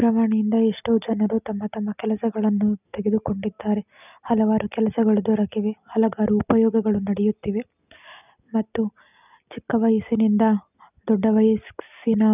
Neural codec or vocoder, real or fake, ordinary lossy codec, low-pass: none; real; none; 3.6 kHz